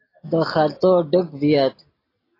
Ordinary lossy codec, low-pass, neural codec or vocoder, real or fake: AAC, 24 kbps; 5.4 kHz; none; real